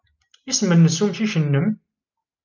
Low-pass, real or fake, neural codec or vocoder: 7.2 kHz; real; none